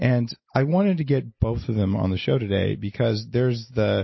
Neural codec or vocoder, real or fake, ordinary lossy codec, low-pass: none; real; MP3, 24 kbps; 7.2 kHz